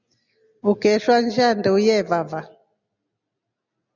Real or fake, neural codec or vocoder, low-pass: real; none; 7.2 kHz